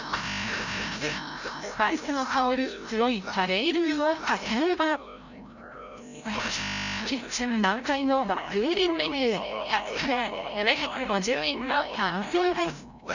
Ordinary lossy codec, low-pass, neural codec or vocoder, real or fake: none; 7.2 kHz; codec, 16 kHz, 0.5 kbps, FreqCodec, larger model; fake